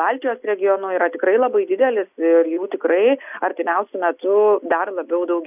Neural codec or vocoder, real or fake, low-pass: none; real; 3.6 kHz